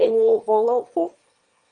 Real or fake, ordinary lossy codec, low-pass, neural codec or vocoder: fake; Opus, 32 kbps; 9.9 kHz; autoencoder, 22.05 kHz, a latent of 192 numbers a frame, VITS, trained on one speaker